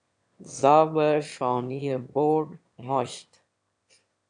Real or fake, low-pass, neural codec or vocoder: fake; 9.9 kHz; autoencoder, 22.05 kHz, a latent of 192 numbers a frame, VITS, trained on one speaker